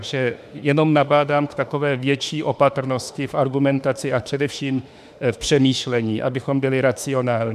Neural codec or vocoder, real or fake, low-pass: autoencoder, 48 kHz, 32 numbers a frame, DAC-VAE, trained on Japanese speech; fake; 14.4 kHz